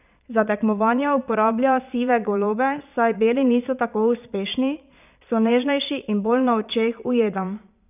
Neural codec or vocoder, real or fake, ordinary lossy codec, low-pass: vocoder, 22.05 kHz, 80 mel bands, Vocos; fake; none; 3.6 kHz